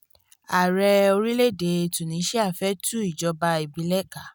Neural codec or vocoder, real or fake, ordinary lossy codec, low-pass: none; real; none; none